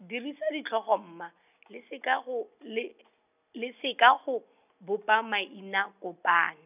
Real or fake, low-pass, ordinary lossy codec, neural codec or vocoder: real; 3.6 kHz; none; none